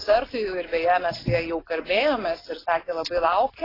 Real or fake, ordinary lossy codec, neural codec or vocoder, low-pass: real; AAC, 24 kbps; none; 5.4 kHz